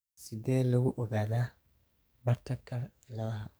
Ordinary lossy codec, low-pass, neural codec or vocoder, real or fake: none; none; codec, 44.1 kHz, 2.6 kbps, SNAC; fake